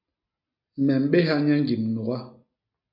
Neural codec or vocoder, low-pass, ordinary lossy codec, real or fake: none; 5.4 kHz; MP3, 48 kbps; real